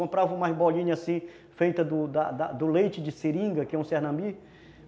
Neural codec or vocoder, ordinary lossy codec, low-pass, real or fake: none; none; none; real